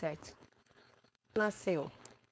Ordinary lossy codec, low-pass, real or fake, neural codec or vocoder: none; none; fake; codec, 16 kHz, 4.8 kbps, FACodec